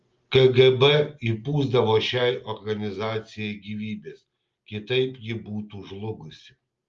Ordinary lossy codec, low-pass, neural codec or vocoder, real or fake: Opus, 32 kbps; 7.2 kHz; none; real